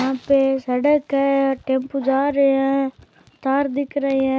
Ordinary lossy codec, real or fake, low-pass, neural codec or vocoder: none; real; none; none